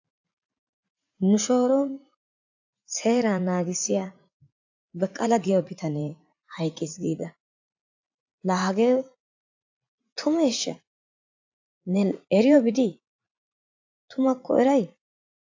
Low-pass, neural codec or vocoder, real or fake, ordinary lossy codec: 7.2 kHz; vocoder, 44.1 kHz, 80 mel bands, Vocos; fake; AAC, 48 kbps